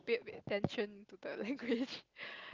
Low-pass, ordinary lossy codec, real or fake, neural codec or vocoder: 7.2 kHz; Opus, 32 kbps; real; none